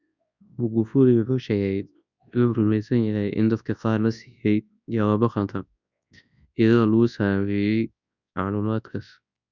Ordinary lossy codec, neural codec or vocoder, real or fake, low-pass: none; codec, 24 kHz, 0.9 kbps, WavTokenizer, large speech release; fake; 7.2 kHz